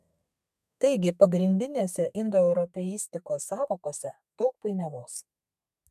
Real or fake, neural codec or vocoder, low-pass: fake; codec, 32 kHz, 1.9 kbps, SNAC; 14.4 kHz